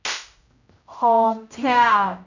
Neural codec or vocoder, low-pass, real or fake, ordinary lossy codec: codec, 16 kHz, 0.5 kbps, X-Codec, HuBERT features, trained on general audio; 7.2 kHz; fake; none